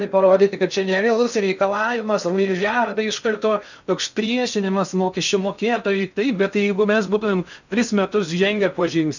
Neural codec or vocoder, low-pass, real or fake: codec, 16 kHz in and 24 kHz out, 0.6 kbps, FocalCodec, streaming, 2048 codes; 7.2 kHz; fake